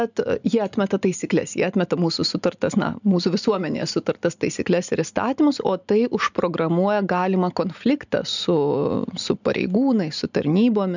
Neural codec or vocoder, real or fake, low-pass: none; real; 7.2 kHz